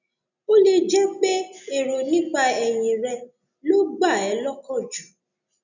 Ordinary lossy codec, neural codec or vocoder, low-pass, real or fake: none; none; 7.2 kHz; real